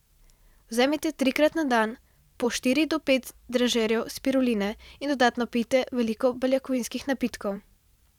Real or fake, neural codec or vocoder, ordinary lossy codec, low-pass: fake; vocoder, 44.1 kHz, 128 mel bands every 512 samples, BigVGAN v2; none; 19.8 kHz